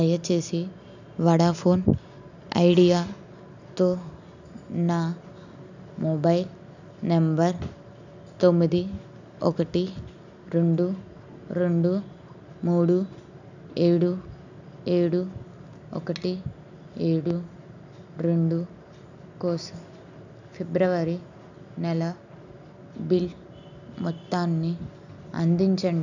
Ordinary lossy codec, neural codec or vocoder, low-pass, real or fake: none; none; 7.2 kHz; real